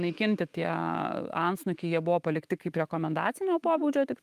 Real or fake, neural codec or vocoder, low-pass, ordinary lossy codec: fake; autoencoder, 48 kHz, 128 numbers a frame, DAC-VAE, trained on Japanese speech; 14.4 kHz; Opus, 32 kbps